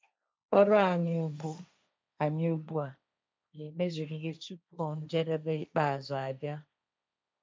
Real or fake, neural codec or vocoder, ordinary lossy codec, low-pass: fake; codec, 16 kHz, 1.1 kbps, Voila-Tokenizer; none; none